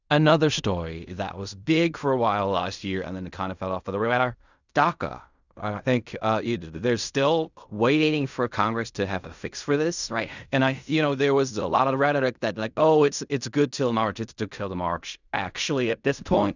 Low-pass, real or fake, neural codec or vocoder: 7.2 kHz; fake; codec, 16 kHz in and 24 kHz out, 0.4 kbps, LongCat-Audio-Codec, fine tuned four codebook decoder